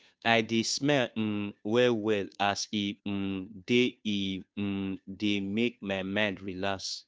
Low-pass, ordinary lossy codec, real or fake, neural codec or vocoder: none; none; fake; codec, 16 kHz, 2 kbps, FunCodec, trained on Chinese and English, 25 frames a second